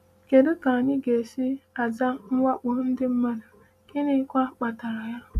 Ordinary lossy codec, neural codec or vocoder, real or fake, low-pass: none; none; real; 14.4 kHz